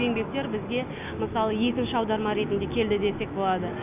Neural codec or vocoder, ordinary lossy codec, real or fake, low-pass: none; none; real; 3.6 kHz